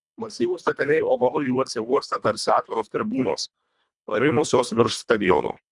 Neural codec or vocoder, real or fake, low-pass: codec, 24 kHz, 1.5 kbps, HILCodec; fake; 10.8 kHz